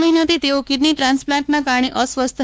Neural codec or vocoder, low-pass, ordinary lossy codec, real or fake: codec, 16 kHz, 2 kbps, X-Codec, WavLM features, trained on Multilingual LibriSpeech; none; none; fake